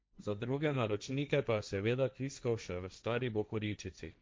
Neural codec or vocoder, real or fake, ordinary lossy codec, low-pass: codec, 16 kHz, 1.1 kbps, Voila-Tokenizer; fake; none; none